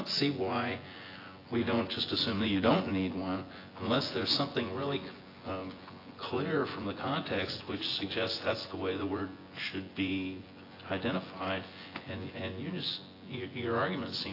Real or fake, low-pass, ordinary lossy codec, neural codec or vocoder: fake; 5.4 kHz; AAC, 24 kbps; vocoder, 24 kHz, 100 mel bands, Vocos